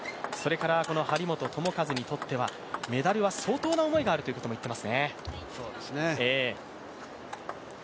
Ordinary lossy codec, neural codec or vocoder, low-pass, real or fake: none; none; none; real